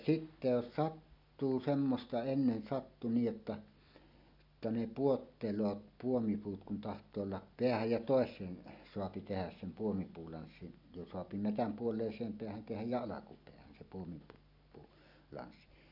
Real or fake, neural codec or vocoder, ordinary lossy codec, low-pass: real; none; none; 5.4 kHz